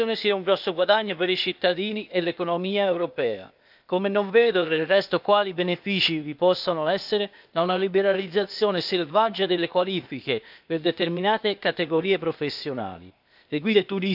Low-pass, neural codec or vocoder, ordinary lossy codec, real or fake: 5.4 kHz; codec, 16 kHz, 0.8 kbps, ZipCodec; none; fake